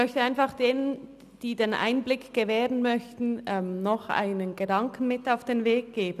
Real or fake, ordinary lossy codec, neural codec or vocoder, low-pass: real; none; none; 14.4 kHz